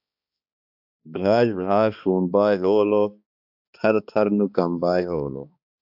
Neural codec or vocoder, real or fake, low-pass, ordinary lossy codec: codec, 16 kHz, 2 kbps, X-Codec, HuBERT features, trained on balanced general audio; fake; 5.4 kHz; AAC, 48 kbps